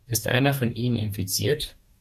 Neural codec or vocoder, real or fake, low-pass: codec, 44.1 kHz, 2.6 kbps, DAC; fake; 14.4 kHz